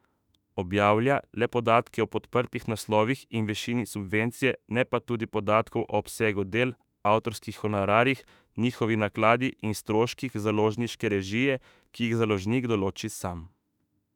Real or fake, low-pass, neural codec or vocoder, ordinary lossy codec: fake; 19.8 kHz; autoencoder, 48 kHz, 32 numbers a frame, DAC-VAE, trained on Japanese speech; none